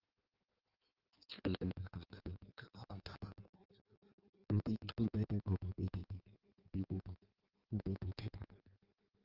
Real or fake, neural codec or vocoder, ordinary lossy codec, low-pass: fake; codec, 16 kHz in and 24 kHz out, 1.1 kbps, FireRedTTS-2 codec; Opus, 16 kbps; 5.4 kHz